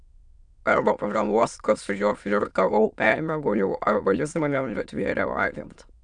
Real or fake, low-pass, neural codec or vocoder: fake; 9.9 kHz; autoencoder, 22.05 kHz, a latent of 192 numbers a frame, VITS, trained on many speakers